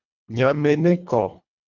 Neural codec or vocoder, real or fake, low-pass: codec, 24 kHz, 1.5 kbps, HILCodec; fake; 7.2 kHz